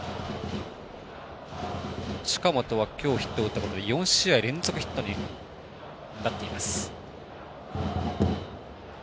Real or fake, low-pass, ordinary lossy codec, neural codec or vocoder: real; none; none; none